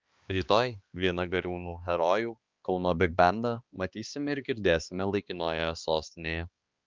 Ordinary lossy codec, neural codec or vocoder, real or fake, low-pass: Opus, 24 kbps; codec, 16 kHz, 2 kbps, X-Codec, HuBERT features, trained on balanced general audio; fake; 7.2 kHz